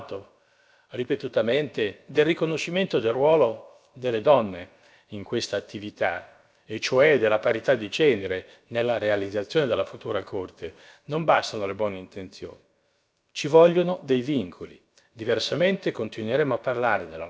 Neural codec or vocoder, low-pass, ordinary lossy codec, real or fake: codec, 16 kHz, about 1 kbps, DyCAST, with the encoder's durations; none; none; fake